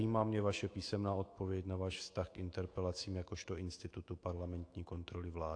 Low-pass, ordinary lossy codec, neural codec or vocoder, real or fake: 9.9 kHz; AAC, 48 kbps; autoencoder, 48 kHz, 128 numbers a frame, DAC-VAE, trained on Japanese speech; fake